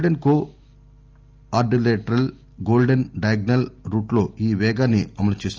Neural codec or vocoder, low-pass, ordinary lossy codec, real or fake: none; 7.2 kHz; Opus, 24 kbps; real